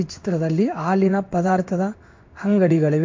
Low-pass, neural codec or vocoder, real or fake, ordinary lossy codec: 7.2 kHz; codec, 16 kHz in and 24 kHz out, 1 kbps, XY-Tokenizer; fake; MP3, 48 kbps